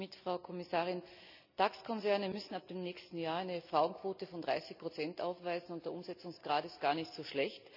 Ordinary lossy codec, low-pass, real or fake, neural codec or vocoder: none; 5.4 kHz; real; none